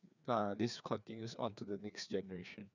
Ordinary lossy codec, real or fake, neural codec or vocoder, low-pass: none; fake; codec, 16 kHz, 2 kbps, FreqCodec, larger model; 7.2 kHz